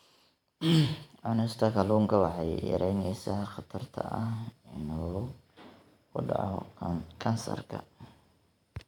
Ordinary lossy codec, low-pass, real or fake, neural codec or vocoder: none; 19.8 kHz; fake; vocoder, 44.1 kHz, 128 mel bands every 512 samples, BigVGAN v2